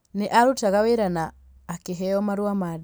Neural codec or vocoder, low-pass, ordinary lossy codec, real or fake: none; none; none; real